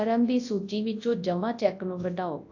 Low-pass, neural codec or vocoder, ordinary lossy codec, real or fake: 7.2 kHz; codec, 24 kHz, 0.9 kbps, WavTokenizer, large speech release; AAC, 48 kbps; fake